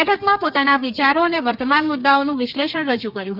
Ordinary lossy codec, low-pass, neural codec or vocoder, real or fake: none; 5.4 kHz; codec, 32 kHz, 1.9 kbps, SNAC; fake